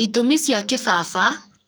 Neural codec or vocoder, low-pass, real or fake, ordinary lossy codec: codec, 44.1 kHz, 2.6 kbps, SNAC; none; fake; none